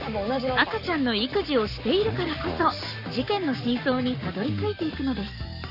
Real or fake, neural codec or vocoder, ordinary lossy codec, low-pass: fake; codec, 44.1 kHz, 7.8 kbps, Pupu-Codec; none; 5.4 kHz